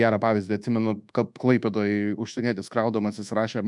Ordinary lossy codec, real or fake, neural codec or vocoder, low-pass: MP3, 96 kbps; fake; codec, 24 kHz, 1.2 kbps, DualCodec; 10.8 kHz